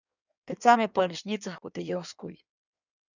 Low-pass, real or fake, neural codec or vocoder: 7.2 kHz; fake; codec, 16 kHz in and 24 kHz out, 1.1 kbps, FireRedTTS-2 codec